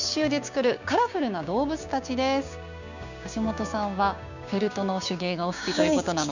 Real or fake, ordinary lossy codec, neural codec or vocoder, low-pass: fake; none; codec, 16 kHz, 6 kbps, DAC; 7.2 kHz